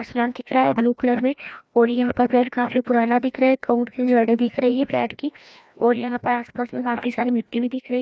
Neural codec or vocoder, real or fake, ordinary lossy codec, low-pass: codec, 16 kHz, 1 kbps, FreqCodec, larger model; fake; none; none